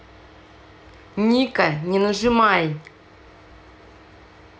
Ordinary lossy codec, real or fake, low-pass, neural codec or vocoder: none; real; none; none